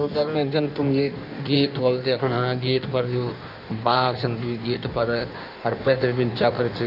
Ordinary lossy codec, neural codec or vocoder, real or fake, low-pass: none; codec, 16 kHz in and 24 kHz out, 1.1 kbps, FireRedTTS-2 codec; fake; 5.4 kHz